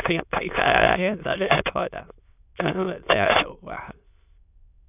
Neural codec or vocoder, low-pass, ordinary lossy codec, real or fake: autoencoder, 22.05 kHz, a latent of 192 numbers a frame, VITS, trained on many speakers; 3.6 kHz; none; fake